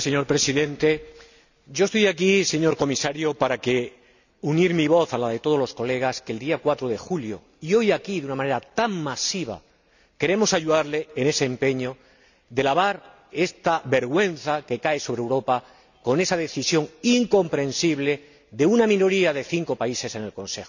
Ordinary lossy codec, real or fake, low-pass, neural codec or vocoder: none; real; 7.2 kHz; none